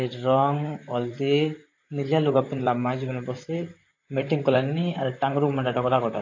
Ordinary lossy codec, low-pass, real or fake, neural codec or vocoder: AAC, 48 kbps; 7.2 kHz; fake; vocoder, 44.1 kHz, 128 mel bands, Pupu-Vocoder